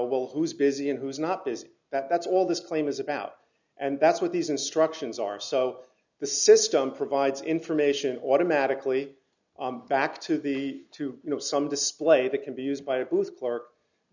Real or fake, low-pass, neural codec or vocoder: real; 7.2 kHz; none